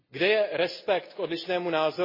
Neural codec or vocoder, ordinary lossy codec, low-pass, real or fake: none; MP3, 24 kbps; 5.4 kHz; real